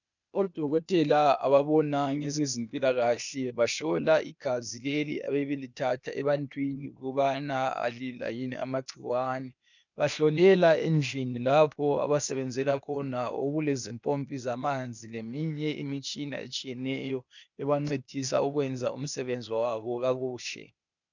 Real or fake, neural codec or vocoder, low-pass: fake; codec, 16 kHz, 0.8 kbps, ZipCodec; 7.2 kHz